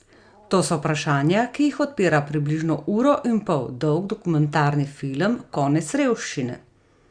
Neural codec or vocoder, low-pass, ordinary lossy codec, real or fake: none; 9.9 kHz; Opus, 64 kbps; real